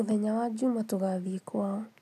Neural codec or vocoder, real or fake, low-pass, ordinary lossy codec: none; real; 14.4 kHz; none